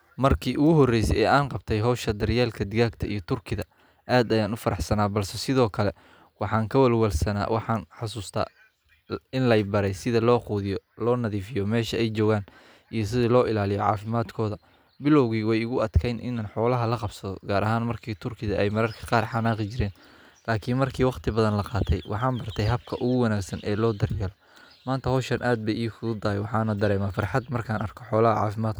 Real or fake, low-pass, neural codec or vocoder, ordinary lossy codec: real; none; none; none